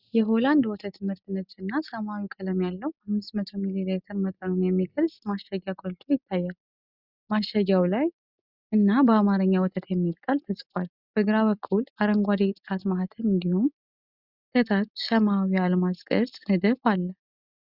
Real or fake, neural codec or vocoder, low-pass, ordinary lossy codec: real; none; 5.4 kHz; Opus, 64 kbps